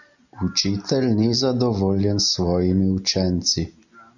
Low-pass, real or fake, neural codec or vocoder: 7.2 kHz; real; none